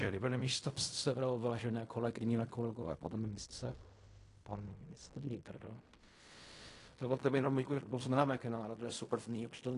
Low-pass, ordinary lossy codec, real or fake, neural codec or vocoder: 10.8 kHz; AAC, 48 kbps; fake; codec, 16 kHz in and 24 kHz out, 0.4 kbps, LongCat-Audio-Codec, fine tuned four codebook decoder